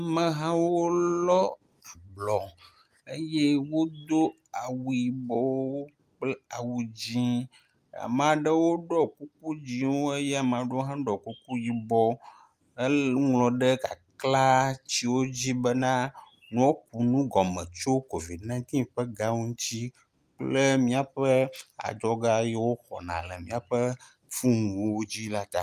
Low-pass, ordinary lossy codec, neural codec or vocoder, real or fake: 14.4 kHz; Opus, 32 kbps; none; real